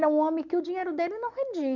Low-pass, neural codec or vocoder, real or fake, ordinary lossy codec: 7.2 kHz; none; real; none